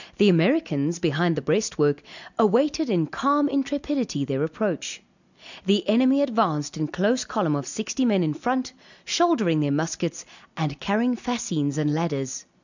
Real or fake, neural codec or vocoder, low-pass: real; none; 7.2 kHz